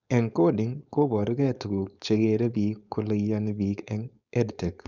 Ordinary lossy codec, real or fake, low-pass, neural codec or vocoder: none; fake; 7.2 kHz; codec, 16 kHz, 4.8 kbps, FACodec